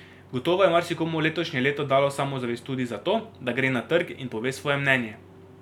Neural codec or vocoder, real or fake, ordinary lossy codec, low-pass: none; real; none; 19.8 kHz